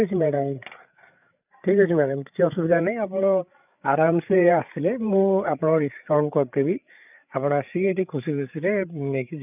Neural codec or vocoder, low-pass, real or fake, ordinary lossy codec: codec, 16 kHz, 8 kbps, FreqCodec, larger model; 3.6 kHz; fake; none